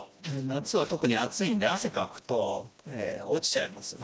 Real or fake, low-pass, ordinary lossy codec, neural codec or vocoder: fake; none; none; codec, 16 kHz, 1 kbps, FreqCodec, smaller model